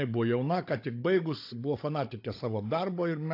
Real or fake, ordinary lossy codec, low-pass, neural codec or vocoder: fake; AAC, 32 kbps; 5.4 kHz; codec, 16 kHz, 16 kbps, FunCodec, trained on Chinese and English, 50 frames a second